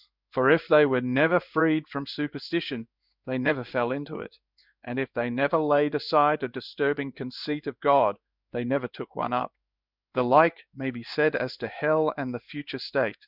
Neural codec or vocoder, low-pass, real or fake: codec, 16 kHz in and 24 kHz out, 1 kbps, XY-Tokenizer; 5.4 kHz; fake